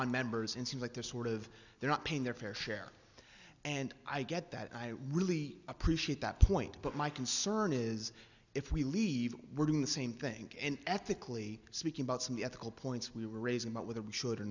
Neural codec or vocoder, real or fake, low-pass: none; real; 7.2 kHz